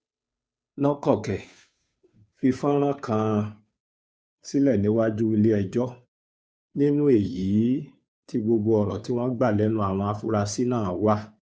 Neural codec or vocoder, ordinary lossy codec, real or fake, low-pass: codec, 16 kHz, 2 kbps, FunCodec, trained on Chinese and English, 25 frames a second; none; fake; none